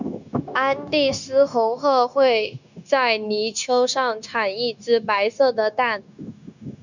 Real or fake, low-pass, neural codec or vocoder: fake; 7.2 kHz; codec, 16 kHz, 0.9 kbps, LongCat-Audio-Codec